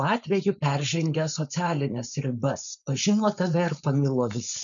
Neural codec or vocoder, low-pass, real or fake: codec, 16 kHz, 4.8 kbps, FACodec; 7.2 kHz; fake